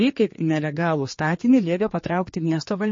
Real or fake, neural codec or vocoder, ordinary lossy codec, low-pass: fake; codec, 16 kHz, 2 kbps, X-Codec, HuBERT features, trained on general audio; MP3, 32 kbps; 7.2 kHz